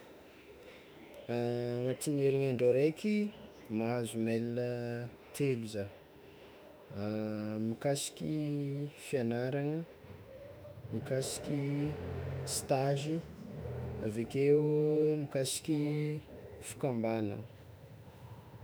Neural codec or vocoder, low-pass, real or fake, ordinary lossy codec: autoencoder, 48 kHz, 32 numbers a frame, DAC-VAE, trained on Japanese speech; none; fake; none